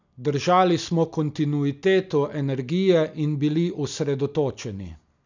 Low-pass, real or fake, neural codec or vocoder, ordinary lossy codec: 7.2 kHz; real; none; none